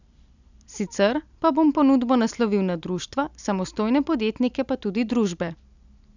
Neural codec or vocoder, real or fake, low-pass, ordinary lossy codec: none; real; 7.2 kHz; none